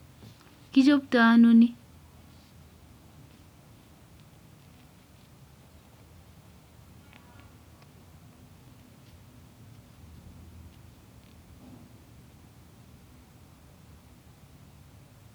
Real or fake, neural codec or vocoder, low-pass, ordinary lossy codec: real; none; none; none